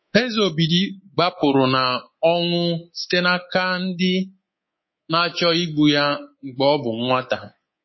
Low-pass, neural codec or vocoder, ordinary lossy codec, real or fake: 7.2 kHz; codec, 24 kHz, 3.1 kbps, DualCodec; MP3, 24 kbps; fake